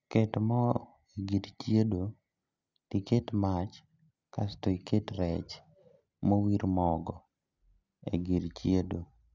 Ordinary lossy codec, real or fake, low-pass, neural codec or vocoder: none; real; 7.2 kHz; none